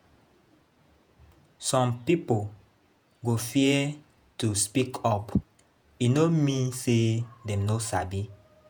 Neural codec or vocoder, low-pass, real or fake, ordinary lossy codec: vocoder, 48 kHz, 128 mel bands, Vocos; none; fake; none